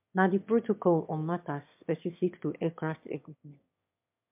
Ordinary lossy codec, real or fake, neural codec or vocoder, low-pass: MP3, 32 kbps; fake; autoencoder, 22.05 kHz, a latent of 192 numbers a frame, VITS, trained on one speaker; 3.6 kHz